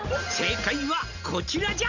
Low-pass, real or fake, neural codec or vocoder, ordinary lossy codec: 7.2 kHz; real; none; none